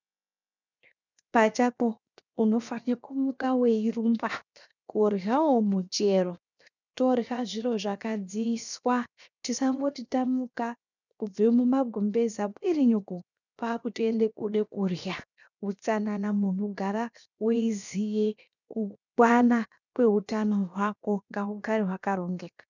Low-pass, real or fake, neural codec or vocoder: 7.2 kHz; fake; codec, 16 kHz, 0.7 kbps, FocalCodec